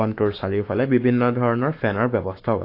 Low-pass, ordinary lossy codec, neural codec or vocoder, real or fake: 5.4 kHz; AAC, 32 kbps; codec, 44.1 kHz, 7.8 kbps, Pupu-Codec; fake